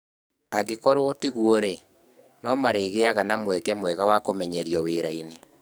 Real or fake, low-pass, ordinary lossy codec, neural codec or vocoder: fake; none; none; codec, 44.1 kHz, 2.6 kbps, SNAC